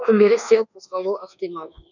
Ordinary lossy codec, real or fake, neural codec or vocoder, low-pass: none; fake; codec, 24 kHz, 1.2 kbps, DualCodec; 7.2 kHz